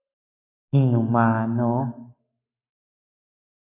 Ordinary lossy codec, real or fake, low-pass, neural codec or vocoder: AAC, 32 kbps; fake; 3.6 kHz; codec, 44.1 kHz, 7.8 kbps, Pupu-Codec